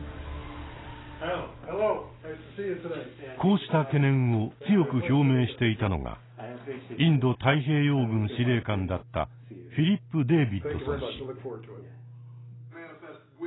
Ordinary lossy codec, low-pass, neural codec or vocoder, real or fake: AAC, 16 kbps; 7.2 kHz; none; real